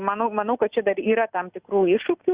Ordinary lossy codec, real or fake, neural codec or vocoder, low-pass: Opus, 64 kbps; real; none; 3.6 kHz